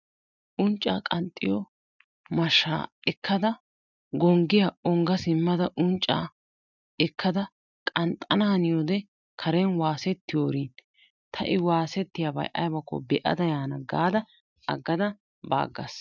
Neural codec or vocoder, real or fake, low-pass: none; real; 7.2 kHz